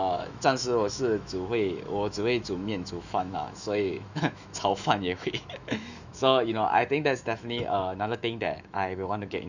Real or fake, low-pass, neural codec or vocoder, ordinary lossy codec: fake; 7.2 kHz; autoencoder, 48 kHz, 128 numbers a frame, DAC-VAE, trained on Japanese speech; none